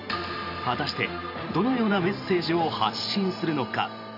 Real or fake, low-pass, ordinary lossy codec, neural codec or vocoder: fake; 5.4 kHz; none; vocoder, 44.1 kHz, 128 mel bands every 512 samples, BigVGAN v2